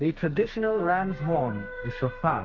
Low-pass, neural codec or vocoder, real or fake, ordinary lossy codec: 7.2 kHz; codec, 32 kHz, 1.9 kbps, SNAC; fake; MP3, 48 kbps